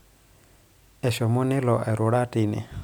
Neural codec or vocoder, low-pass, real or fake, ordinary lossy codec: none; none; real; none